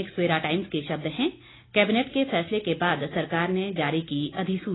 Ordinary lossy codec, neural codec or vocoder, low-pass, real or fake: AAC, 16 kbps; none; 7.2 kHz; real